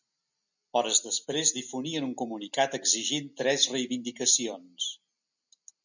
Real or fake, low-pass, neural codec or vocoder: real; 7.2 kHz; none